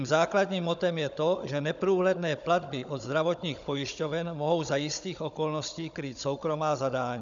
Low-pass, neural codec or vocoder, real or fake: 7.2 kHz; codec, 16 kHz, 16 kbps, FunCodec, trained on Chinese and English, 50 frames a second; fake